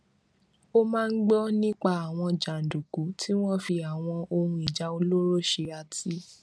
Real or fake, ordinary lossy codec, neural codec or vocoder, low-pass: real; none; none; none